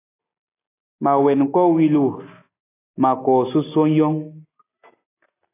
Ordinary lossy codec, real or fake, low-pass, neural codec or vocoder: AAC, 16 kbps; real; 3.6 kHz; none